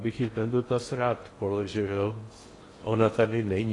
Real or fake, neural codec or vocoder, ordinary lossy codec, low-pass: fake; codec, 16 kHz in and 24 kHz out, 0.8 kbps, FocalCodec, streaming, 65536 codes; AAC, 32 kbps; 10.8 kHz